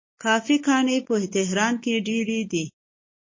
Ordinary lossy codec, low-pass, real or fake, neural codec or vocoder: MP3, 32 kbps; 7.2 kHz; real; none